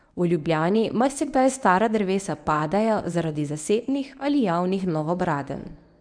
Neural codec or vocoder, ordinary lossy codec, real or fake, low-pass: codec, 24 kHz, 0.9 kbps, WavTokenizer, medium speech release version 1; none; fake; 9.9 kHz